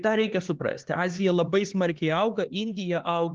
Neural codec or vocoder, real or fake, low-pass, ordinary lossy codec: codec, 16 kHz, 4 kbps, X-Codec, HuBERT features, trained on LibriSpeech; fake; 7.2 kHz; Opus, 16 kbps